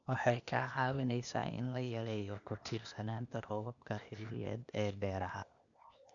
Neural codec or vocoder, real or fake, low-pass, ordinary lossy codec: codec, 16 kHz, 0.8 kbps, ZipCodec; fake; 7.2 kHz; none